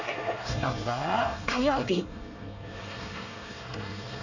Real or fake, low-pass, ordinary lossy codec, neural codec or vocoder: fake; 7.2 kHz; none; codec, 24 kHz, 1 kbps, SNAC